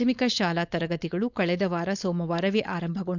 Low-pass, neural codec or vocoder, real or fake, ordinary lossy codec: 7.2 kHz; codec, 16 kHz, 4.8 kbps, FACodec; fake; none